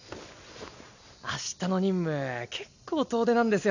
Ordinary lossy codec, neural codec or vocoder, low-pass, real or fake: none; none; 7.2 kHz; real